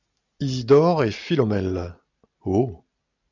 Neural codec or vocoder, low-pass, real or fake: none; 7.2 kHz; real